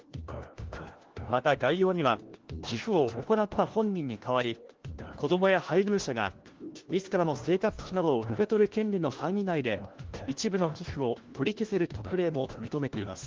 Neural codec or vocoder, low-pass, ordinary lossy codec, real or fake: codec, 16 kHz, 1 kbps, FunCodec, trained on LibriTTS, 50 frames a second; 7.2 kHz; Opus, 16 kbps; fake